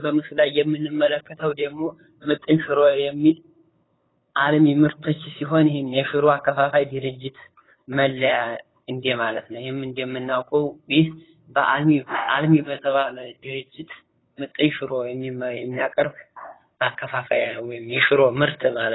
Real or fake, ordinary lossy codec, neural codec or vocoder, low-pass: fake; AAC, 16 kbps; codec, 16 kHz, 8 kbps, FunCodec, trained on LibriTTS, 25 frames a second; 7.2 kHz